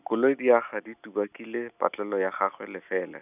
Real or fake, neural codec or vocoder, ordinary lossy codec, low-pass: real; none; none; 3.6 kHz